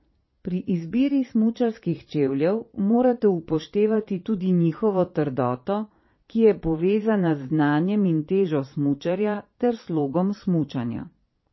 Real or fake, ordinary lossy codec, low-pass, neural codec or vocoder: fake; MP3, 24 kbps; 7.2 kHz; vocoder, 22.05 kHz, 80 mel bands, Vocos